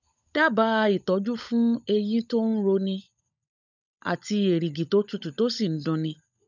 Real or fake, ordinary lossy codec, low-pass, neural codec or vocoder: fake; none; 7.2 kHz; codec, 16 kHz, 16 kbps, FunCodec, trained on LibriTTS, 50 frames a second